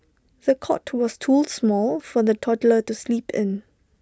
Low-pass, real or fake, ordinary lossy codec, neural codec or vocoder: none; real; none; none